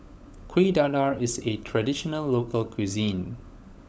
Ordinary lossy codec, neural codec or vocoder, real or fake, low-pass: none; codec, 16 kHz, 16 kbps, FunCodec, trained on LibriTTS, 50 frames a second; fake; none